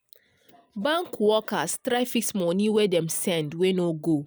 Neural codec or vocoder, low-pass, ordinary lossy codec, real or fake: none; none; none; real